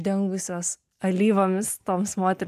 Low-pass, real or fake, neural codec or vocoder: 14.4 kHz; real; none